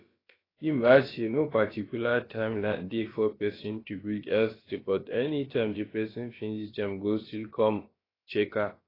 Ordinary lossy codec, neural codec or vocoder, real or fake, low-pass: AAC, 24 kbps; codec, 16 kHz, about 1 kbps, DyCAST, with the encoder's durations; fake; 5.4 kHz